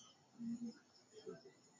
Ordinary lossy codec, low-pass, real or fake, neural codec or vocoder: MP3, 32 kbps; 7.2 kHz; real; none